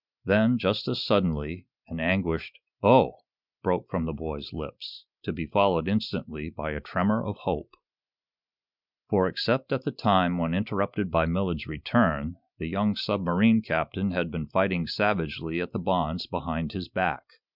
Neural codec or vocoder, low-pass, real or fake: none; 5.4 kHz; real